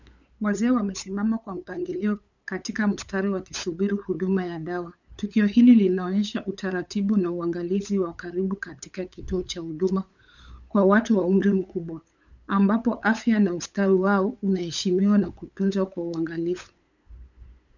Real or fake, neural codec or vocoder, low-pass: fake; codec, 16 kHz, 8 kbps, FunCodec, trained on LibriTTS, 25 frames a second; 7.2 kHz